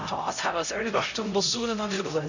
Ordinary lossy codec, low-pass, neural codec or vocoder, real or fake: none; 7.2 kHz; codec, 16 kHz, 0.5 kbps, X-Codec, HuBERT features, trained on LibriSpeech; fake